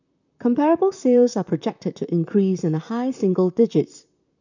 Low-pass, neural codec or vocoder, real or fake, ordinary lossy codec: 7.2 kHz; vocoder, 44.1 kHz, 128 mel bands, Pupu-Vocoder; fake; none